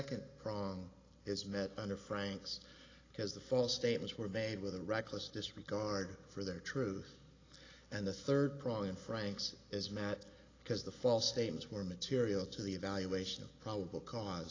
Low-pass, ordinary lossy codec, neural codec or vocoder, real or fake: 7.2 kHz; AAC, 32 kbps; none; real